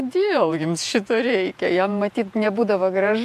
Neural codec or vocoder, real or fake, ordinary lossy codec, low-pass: vocoder, 48 kHz, 128 mel bands, Vocos; fake; MP3, 64 kbps; 14.4 kHz